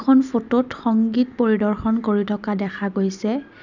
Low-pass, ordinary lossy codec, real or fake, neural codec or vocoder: 7.2 kHz; none; real; none